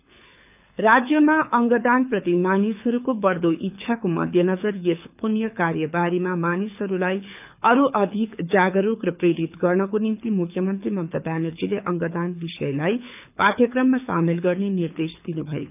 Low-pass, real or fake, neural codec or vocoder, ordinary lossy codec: 3.6 kHz; fake; codec, 24 kHz, 6 kbps, HILCodec; none